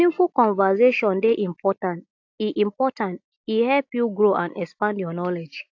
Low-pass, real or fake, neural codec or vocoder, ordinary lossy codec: 7.2 kHz; real; none; AAC, 48 kbps